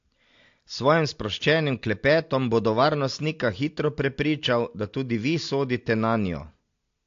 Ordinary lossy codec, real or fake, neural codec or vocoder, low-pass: AAC, 48 kbps; real; none; 7.2 kHz